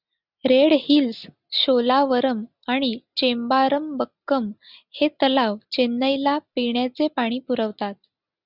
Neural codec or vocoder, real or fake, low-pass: none; real; 5.4 kHz